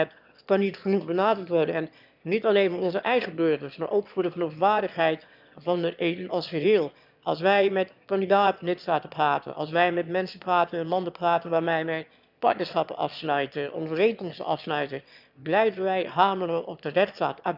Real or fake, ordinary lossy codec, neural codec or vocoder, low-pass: fake; none; autoencoder, 22.05 kHz, a latent of 192 numbers a frame, VITS, trained on one speaker; 5.4 kHz